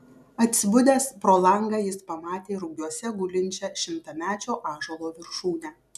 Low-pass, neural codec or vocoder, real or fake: 14.4 kHz; none; real